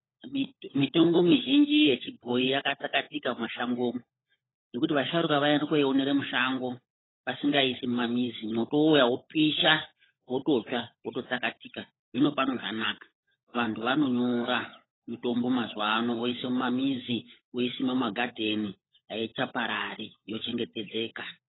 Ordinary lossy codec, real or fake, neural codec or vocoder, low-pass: AAC, 16 kbps; fake; codec, 16 kHz, 16 kbps, FunCodec, trained on LibriTTS, 50 frames a second; 7.2 kHz